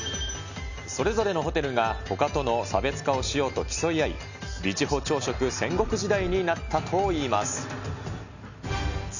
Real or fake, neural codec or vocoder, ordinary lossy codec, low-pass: real; none; none; 7.2 kHz